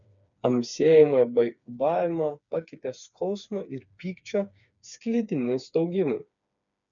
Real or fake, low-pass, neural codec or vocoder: fake; 7.2 kHz; codec, 16 kHz, 4 kbps, FreqCodec, smaller model